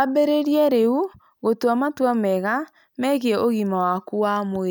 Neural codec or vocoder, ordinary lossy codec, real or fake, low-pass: none; none; real; none